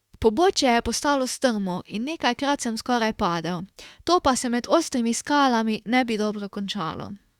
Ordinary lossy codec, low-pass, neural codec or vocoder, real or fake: Opus, 64 kbps; 19.8 kHz; autoencoder, 48 kHz, 32 numbers a frame, DAC-VAE, trained on Japanese speech; fake